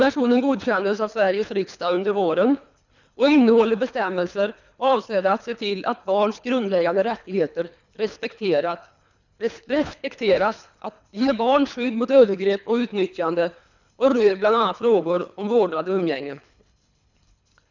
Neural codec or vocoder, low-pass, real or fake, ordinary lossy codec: codec, 24 kHz, 3 kbps, HILCodec; 7.2 kHz; fake; none